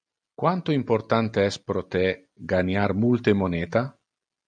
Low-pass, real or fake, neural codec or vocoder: 9.9 kHz; real; none